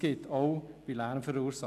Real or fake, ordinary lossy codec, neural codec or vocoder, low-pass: real; none; none; 14.4 kHz